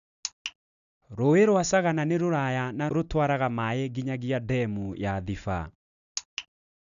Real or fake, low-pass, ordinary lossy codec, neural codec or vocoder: real; 7.2 kHz; none; none